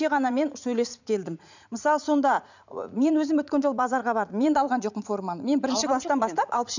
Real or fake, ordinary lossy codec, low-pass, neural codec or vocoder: fake; none; 7.2 kHz; vocoder, 44.1 kHz, 80 mel bands, Vocos